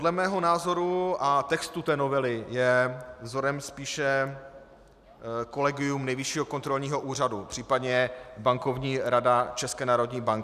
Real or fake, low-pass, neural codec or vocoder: real; 14.4 kHz; none